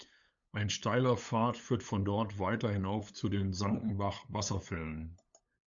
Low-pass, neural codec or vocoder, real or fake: 7.2 kHz; codec, 16 kHz, 8 kbps, FunCodec, trained on LibriTTS, 25 frames a second; fake